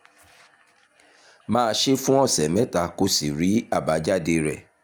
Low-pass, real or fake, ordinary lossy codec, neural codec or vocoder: none; real; none; none